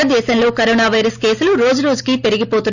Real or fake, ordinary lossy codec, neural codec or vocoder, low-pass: real; none; none; 7.2 kHz